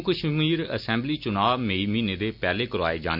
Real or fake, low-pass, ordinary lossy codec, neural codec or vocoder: real; 5.4 kHz; none; none